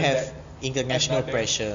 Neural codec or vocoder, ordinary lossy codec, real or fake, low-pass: none; Opus, 64 kbps; real; 7.2 kHz